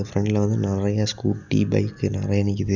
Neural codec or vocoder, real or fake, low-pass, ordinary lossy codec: none; real; 7.2 kHz; none